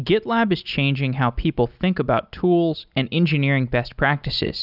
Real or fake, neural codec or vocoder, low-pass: real; none; 5.4 kHz